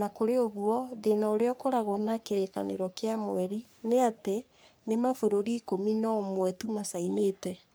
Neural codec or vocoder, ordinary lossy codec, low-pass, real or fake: codec, 44.1 kHz, 3.4 kbps, Pupu-Codec; none; none; fake